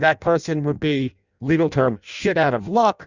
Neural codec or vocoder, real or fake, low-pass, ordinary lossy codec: codec, 16 kHz in and 24 kHz out, 0.6 kbps, FireRedTTS-2 codec; fake; 7.2 kHz; Opus, 64 kbps